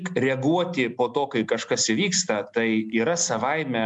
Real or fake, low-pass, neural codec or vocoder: real; 10.8 kHz; none